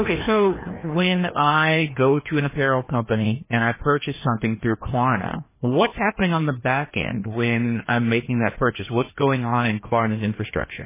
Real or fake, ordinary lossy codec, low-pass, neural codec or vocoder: fake; MP3, 16 kbps; 3.6 kHz; codec, 16 kHz, 1 kbps, FreqCodec, larger model